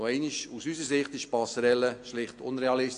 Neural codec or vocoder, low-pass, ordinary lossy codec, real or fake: none; 9.9 kHz; AAC, 48 kbps; real